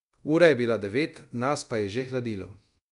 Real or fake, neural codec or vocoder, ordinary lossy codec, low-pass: fake; codec, 24 kHz, 0.5 kbps, DualCodec; none; 10.8 kHz